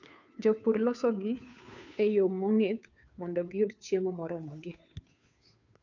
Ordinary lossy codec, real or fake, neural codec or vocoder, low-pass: none; fake; codec, 16 kHz, 2 kbps, FunCodec, trained on Chinese and English, 25 frames a second; 7.2 kHz